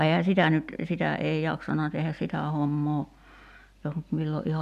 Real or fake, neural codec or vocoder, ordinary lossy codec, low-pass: real; none; MP3, 96 kbps; 14.4 kHz